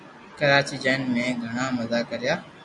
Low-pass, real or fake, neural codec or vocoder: 10.8 kHz; real; none